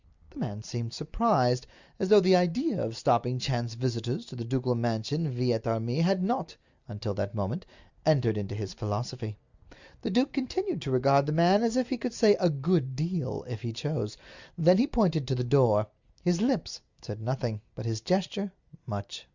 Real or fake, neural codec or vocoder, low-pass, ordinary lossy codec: real; none; 7.2 kHz; Opus, 64 kbps